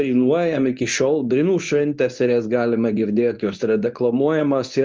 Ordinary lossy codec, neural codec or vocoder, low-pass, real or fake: Opus, 24 kbps; codec, 24 kHz, 0.9 kbps, WavTokenizer, medium speech release version 2; 7.2 kHz; fake